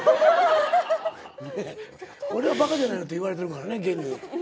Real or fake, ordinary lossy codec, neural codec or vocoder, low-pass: real; none; none; none